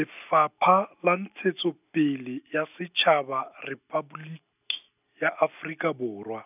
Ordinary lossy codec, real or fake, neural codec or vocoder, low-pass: none; real; none; 3.6 kHz